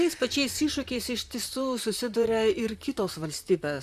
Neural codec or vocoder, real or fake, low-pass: vocoder, 44.1 kHz, 128 mel bands, Pupu-Vocoder; fake; 14.4 kHz